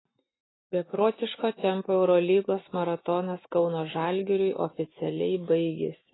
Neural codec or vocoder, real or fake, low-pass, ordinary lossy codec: none; real; 7.2 kHz; AAC, 16 kbps